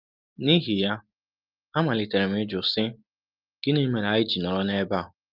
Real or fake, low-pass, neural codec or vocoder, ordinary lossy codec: real; 5.4 kHz; none; Opus, 32 kbps